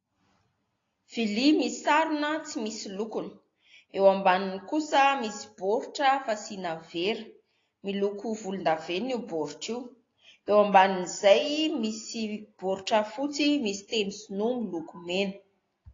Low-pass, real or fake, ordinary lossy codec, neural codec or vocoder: 7.2 kHz; real; AAC, 32 kbps; none